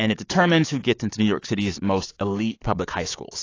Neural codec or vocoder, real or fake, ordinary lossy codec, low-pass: codec, 16 kHz, 6 kbps, DAC; fake; AAC, 32 kbps; 7.2 kHz